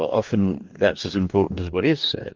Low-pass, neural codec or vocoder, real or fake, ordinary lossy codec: 7.2 kHz; codec, 44.1 kHz, 2.6 kbps, DAC; fake; Opus, 32 kbps